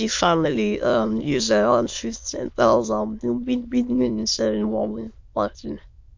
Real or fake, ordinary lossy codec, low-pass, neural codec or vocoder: fake; MP3, 48 kbps; 7.2 kHz; autoencoder, 22.05 kHz, a latent of 192 numbers a frame, VITS, trained on many speakers